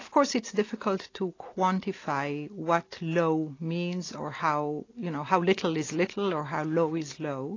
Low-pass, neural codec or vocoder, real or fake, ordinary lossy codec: 7.2 kHz; none; real; AAC, 32 kbps